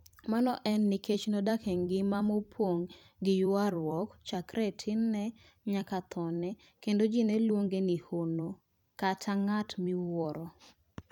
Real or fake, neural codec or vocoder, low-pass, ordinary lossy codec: fake; vocoder, 44.1 kHz, 128 mel bands every 256 samples, BigVGAN v2; 19.8 kHz; none